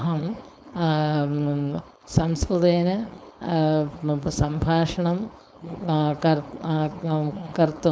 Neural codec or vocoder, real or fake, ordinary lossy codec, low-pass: codec, 16 kHz, 4.8 kbps, FACodec; fake; none; none